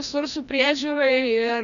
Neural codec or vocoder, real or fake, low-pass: codec, 16 kHz, 1 kbps, FreqCodec, larger model; fake; 7.2 kHz